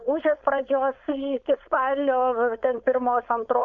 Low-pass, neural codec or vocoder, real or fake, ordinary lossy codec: 7.2 kHz; codec, 16 kHz, 4.8 kbps, FACodec; fake; AAC, 64 kbps